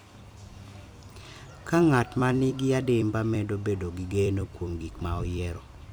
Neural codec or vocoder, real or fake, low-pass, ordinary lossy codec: vocoder, 44.1 kHz, 128 mel bands every 256 samples, BigVGAN v2; fake; none; none